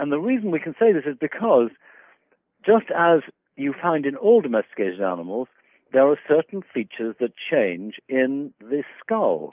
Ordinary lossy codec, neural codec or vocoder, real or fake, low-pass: Opus, 24 kbps; none; real; 3.6 kHz